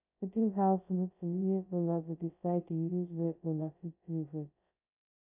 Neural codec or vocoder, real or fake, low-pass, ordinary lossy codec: codec, 16 kHz, 0.2 kbps, FocalCodec; fake; 3.6 kHz; none